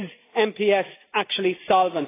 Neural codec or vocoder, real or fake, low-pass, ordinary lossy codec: none; real; 3.6 kHz; AAC, 16 kbps